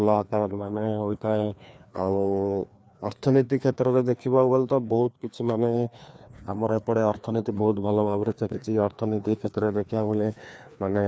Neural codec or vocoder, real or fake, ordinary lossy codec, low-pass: codec, 16 kHz, 2 kbps, FreqCodec, larger model; fake; none; none